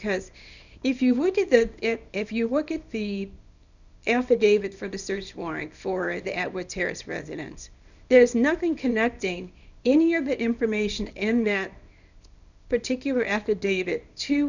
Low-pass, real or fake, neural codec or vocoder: 7.2 kHz; fake; codec, 24 kHz, 0.9 kbps, WavTokenizer, small release